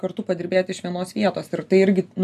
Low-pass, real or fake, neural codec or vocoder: 14.4 kHz; real; none